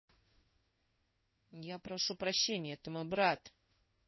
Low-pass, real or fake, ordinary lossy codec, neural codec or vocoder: 7.2 kHz; fake; MP3, 24 kbps; codec, 16 kHz in and 24 kHz out, 1 kbps, XY-Tokenizer